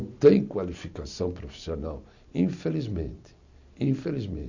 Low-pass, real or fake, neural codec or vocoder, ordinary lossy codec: 7.2 kHz; real; none; none